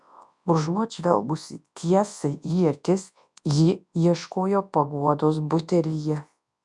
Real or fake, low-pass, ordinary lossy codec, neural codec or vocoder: fake; 10.8 kHz; MP3, 96 kbps; codec, 24 kHz, 0.9 kbps, WavTokenizer, large speech release